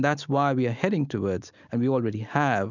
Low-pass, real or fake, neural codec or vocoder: 7.2 kHz; real; none